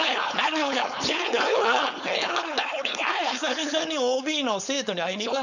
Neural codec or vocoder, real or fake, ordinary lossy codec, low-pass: codec, 16 kHz, 4.8 kbps, FACodec; fake; none; 7.2 kHz